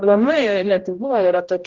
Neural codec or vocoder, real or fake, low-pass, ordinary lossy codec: codec, 16 kHz, 0.5 kbps, X-Codec, HuBERT features, trained on general audio; fake; 7.2 kHz; Opus, 16 kbps